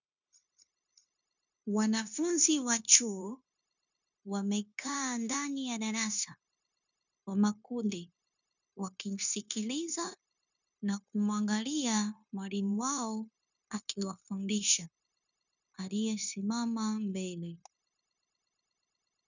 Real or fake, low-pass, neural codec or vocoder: fake; 7.2 kHz; codec, 16 kHz, 0.9 kbps, LongCat-Audio-Codec